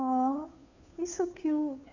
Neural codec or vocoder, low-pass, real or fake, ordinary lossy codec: codec, 16 kHz, 2 kbps, FunCodec, trained on Chinese and English, 25 frames a second; 7.2 kHz; fake; none